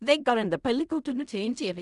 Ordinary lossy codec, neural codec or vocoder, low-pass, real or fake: none; codec, 16 kHz in and 24 kHz out, 0.4 kbps, LongCat-Audio-Codec, fine tuned four codebook decoder; 10.8 kHz; fake